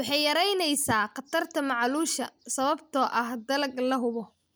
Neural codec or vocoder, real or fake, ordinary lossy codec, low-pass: none; real; none; none